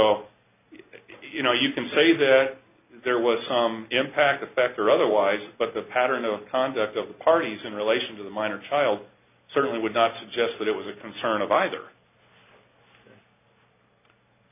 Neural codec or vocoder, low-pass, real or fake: none; 3.6 kHz; real